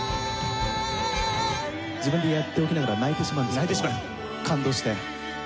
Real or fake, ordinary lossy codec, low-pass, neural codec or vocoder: real; none; none; none